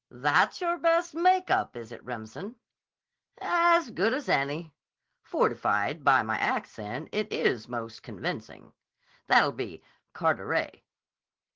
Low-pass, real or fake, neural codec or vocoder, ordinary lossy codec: 7.2 kHz; real; none; Opus, 16 kbps